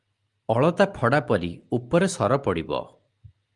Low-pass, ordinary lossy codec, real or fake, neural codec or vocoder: 10.8 kHz; Opus, 32 kbps; real; none